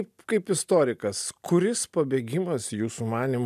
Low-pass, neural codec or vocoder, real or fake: 14.4 kHz; none; real